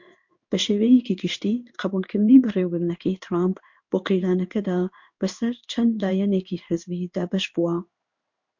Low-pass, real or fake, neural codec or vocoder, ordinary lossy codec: 7.2 kHz; fake; codec, 16 kHz in and 24 kHz out, 1 kbps, XY-Tokenizer; MP3, 48 kbps